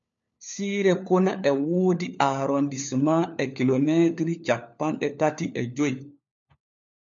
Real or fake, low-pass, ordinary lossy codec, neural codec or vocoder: fake; 7.2 kHz; MP3, 64 kbps; codec, 16 kHz, 4 kbps, FunCodec, trained on LibriTTS, 50 frames a second